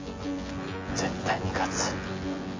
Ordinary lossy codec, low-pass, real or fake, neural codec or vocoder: AAC, 32 kbps; 7.2 kHz; fake; vocoder, 24 kHz, 100 mel bands, Vocos